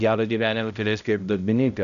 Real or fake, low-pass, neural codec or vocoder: fake; 7.2 kHz; codec, 16 kHz, 0.5 kbps, X-Codec, HuBERT features, trained on balanced general audio